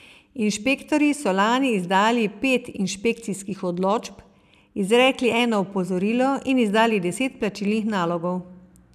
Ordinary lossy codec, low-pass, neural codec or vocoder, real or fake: none; 14.4 kHz; none; real